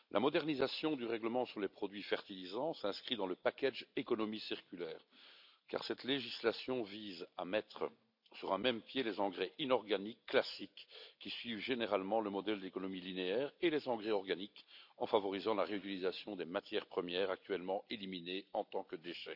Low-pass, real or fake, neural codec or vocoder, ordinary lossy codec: 5.4 kHz; real; none; none